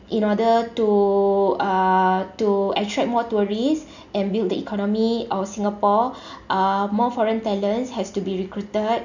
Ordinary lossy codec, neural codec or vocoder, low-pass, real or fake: none; none; 7.2 kHz; real